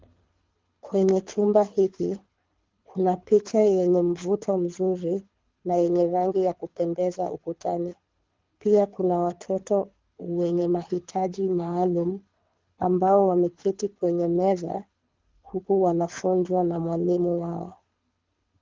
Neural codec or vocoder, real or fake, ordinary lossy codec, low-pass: codec, 24 kHz, 6 kbps, HILCodec; fake; Opus, 32 kbps; 7.2 kHz